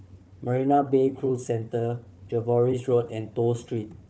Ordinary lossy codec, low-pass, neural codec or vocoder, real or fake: none; none; codec, 16 kHz, 4 kbps, FunCodec, trained on Chinese and English, 50 frames a second; fake